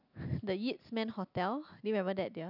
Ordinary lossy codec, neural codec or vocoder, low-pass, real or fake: none; none; 5.4 kHz; real